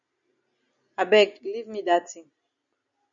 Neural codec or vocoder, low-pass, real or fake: none; 7.2 kHz; real